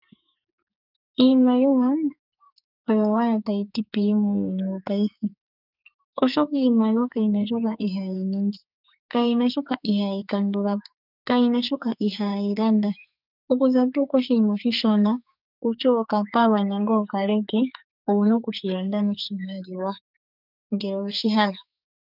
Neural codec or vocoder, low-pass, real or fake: codec, 44.1 kHz, 2.6 kbps, SNAC; 5.4 kHz; fake